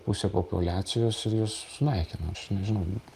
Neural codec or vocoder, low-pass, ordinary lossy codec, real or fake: none; 14.4 kHz; Opus, 32 kbps; real